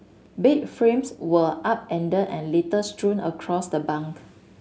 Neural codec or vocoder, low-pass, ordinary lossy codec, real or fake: none; none; none; real